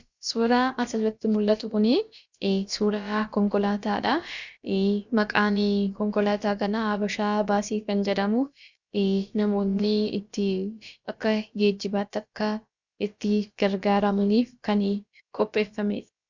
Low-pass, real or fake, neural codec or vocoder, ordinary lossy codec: 7.2 kHz; fake; codec, 16 kHz, about 1 kbps, DyCAST, with the encoder's durations; Opus, 64 kbps